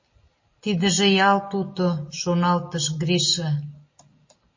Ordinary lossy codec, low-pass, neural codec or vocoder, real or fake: MP3, 32 kbps; 7.2 kHz; none; real